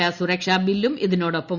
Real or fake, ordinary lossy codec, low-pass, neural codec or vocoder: real; Opus, 64 kbps; 7.2 kHz; none